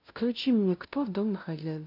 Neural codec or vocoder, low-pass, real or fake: codec, 16 kHz, 0.5 kbps, FunCodec, trained on LibriTTS, 25 frames a second; 5.4 kHz; fake